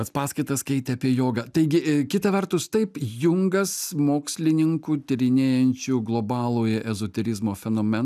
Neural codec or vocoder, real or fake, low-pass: none; real; 14.4 kHz